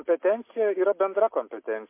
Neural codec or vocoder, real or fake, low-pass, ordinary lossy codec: none; real; 3.6 kHz; MP3, 24 kbps